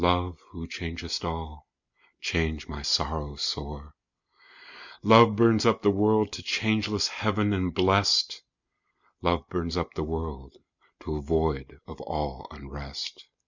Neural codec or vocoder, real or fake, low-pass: none; real; 7.2 kHz